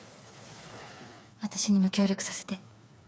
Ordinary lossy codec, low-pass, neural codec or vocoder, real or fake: none; none; codec, 16 kHz, 4 kbps, FreqCodec, smaller model; fake